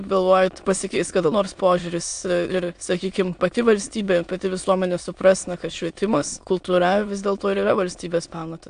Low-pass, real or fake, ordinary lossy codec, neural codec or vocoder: 9.9 kHz; fake; AAC, 64 kbps; autoencoder, 22.05 kHz, a latent of 192 numbers a frame, VITS, trained on many speakers